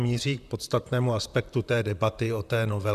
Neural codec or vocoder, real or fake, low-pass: vocoder, 44.1 kHz, 128 mel bands, Pupu-Vocoder; fake; 14.4 kHz